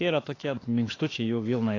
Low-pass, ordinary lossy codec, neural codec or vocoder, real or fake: 7.2 kHz; AAC, 32 kbps; autoencoder, 48 kHz, 32 numbers a frame, DAC-VAE, trained on Japanese speech; fake